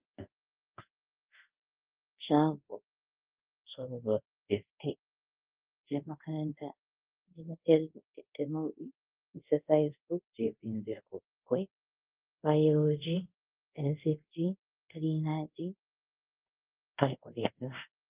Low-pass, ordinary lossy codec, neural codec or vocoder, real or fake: 3.6 kHz; Opus, 32 kbps; codec, 24 kHz, 0.5 kbps, DualCodec; fake